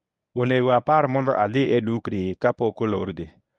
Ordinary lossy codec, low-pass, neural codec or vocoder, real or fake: none; none; codec, 24 kHz, 0.9 kbps, WavTokenizer, medium speech release version 1; fake